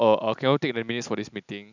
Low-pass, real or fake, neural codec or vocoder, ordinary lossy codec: 7.2 kHz; real; none; none